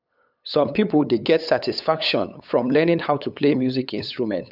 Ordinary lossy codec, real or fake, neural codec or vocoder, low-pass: none; fake; codec, 16 kHz, 8 kbps, FunCodec, trained on LibriTTS, 25 frames a second; 5.4 kHz